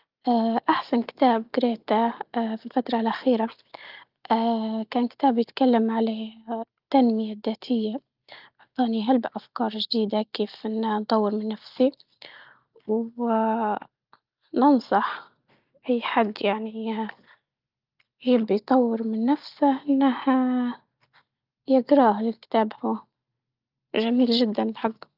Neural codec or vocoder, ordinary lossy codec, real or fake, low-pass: none; Opus, 24 kbps; real; 5.4 kHz